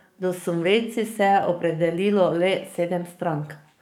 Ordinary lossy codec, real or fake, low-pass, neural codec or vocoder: none; fake; 19.8 kHz; codec, 44.1 kHz, 7.8 kbps, DAC